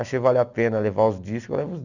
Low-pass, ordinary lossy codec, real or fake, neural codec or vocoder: 7.2 kHz; none; real; none